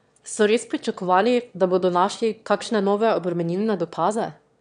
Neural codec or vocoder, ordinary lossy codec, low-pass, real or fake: autoencoder, 22.05 kHz, a latent of 192 numbers a frame, VITS, trained on one speaker; MP3, 96 kbps; 9.9 kHz; fake